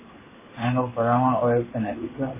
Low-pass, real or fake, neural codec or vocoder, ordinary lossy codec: 3.6 kHz; fake; codec, 16 kHz in and 24 kHz out, 1 kbps, XY-Tokenizer; MP3, 16 kbps